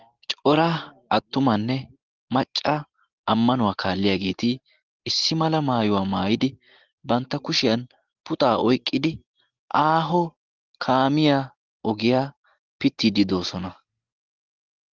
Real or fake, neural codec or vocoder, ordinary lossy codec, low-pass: real; none; Opus, 16 kbps; 7.2 kHz